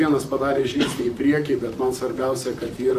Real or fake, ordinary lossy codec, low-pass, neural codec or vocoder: fake; AAC, 96 kbps; 14.4 kHz; vocoder, 44.1 kHz, 128 mel bands, Pupu-Vocoder